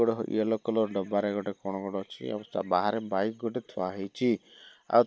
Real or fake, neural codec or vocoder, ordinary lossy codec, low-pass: real; none; none; none